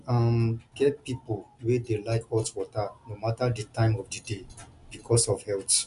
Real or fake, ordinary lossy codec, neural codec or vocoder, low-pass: real; none; none; 10.8 kHz